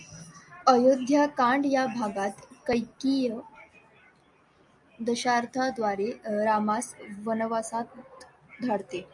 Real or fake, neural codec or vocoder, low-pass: real; none; 10.8 kHz